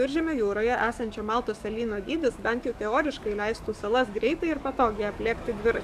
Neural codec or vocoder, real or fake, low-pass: codec, 44.1 kHz, 7.8 kbps, DAC; fake; 14.4 kHz